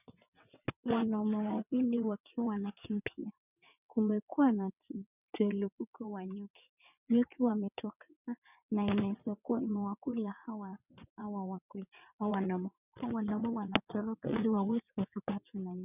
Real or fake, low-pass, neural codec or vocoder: fake; 3.6 kHz; vocoder, 22.05 kHz, 80 mel bands, WaveNeXt